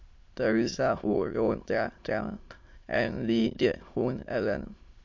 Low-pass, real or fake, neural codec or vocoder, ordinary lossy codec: 7.2 kHz; fake; autoencoder, 22.05 kHz, a latent of 192 numbers a frame, VITS, trained on many speakers; MP3, 48 kbps